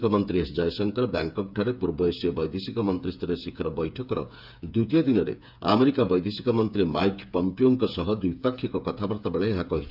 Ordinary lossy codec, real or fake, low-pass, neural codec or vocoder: none; fake; 5.4 kHz; codec, 16 kHz, 8 kbps, FreqCodec, smaller model